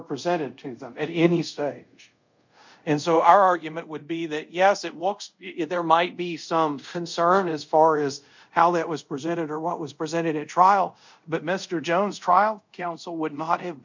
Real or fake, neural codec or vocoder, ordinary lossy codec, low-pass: fake; codec, 24 kHz, 0.5 kbps, DualCodec; MP3, 64 kbps; 7.2 kHz